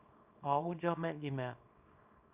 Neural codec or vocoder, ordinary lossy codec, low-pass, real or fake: codec, 24 kHz, 0.9 kbps, WavTokenizer, small release; Opus, 64 kbps; 3.6 kHz; fake